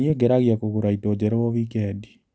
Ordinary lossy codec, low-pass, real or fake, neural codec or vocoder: none; none; real; none